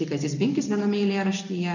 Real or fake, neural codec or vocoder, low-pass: real; none; 7.2 kHz